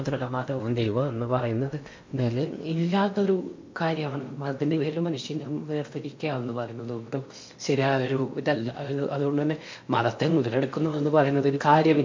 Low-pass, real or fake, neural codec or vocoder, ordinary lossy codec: 7.2 kHz; fake; codec, 16 kHz in and 24 kHz out, 0.8 kbps, FocalCodec, streaming, 65536 codes; MP3, 48 kbps